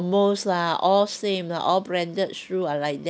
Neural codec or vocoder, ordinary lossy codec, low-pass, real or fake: none; none; none; real